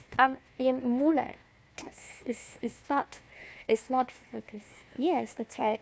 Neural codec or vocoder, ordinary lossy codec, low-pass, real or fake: codec, 16 kHz, 1 kbps, FunCodec, trained on Chinese and English, 50 frames a second; none; none; fake